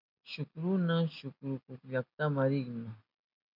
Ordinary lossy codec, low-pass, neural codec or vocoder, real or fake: MP3, 48 kbps; 5.4 kHz; none; real